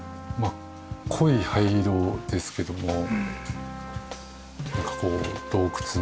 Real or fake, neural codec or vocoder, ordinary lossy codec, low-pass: real; none; none; none